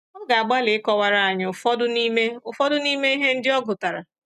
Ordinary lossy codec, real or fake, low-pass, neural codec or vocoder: none; real; 14.4 kHz; none